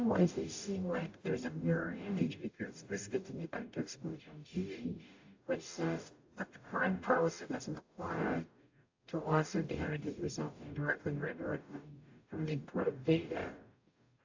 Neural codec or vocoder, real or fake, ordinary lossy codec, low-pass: codec, 44.1 kHz, 0.9 kbps, DAC; fake; AAC, 48 kbps; 7.2 kHz